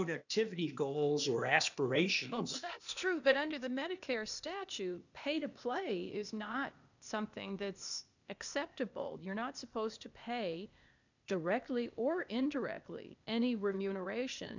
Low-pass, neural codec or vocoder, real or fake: 7.2 kHz; codec, 16 kHz, 0.8 kbps, ZipCodec; fake